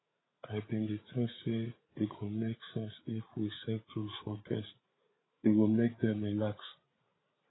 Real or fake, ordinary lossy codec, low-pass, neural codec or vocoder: fake; AAC, 16 kbps; 7.2 kHz; codec, 16 kHz, 8 kbps, FreqCodec, larger model